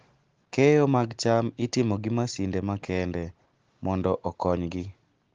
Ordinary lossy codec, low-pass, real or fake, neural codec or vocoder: Opus, 16 kbps; 7.2 kHz; real; none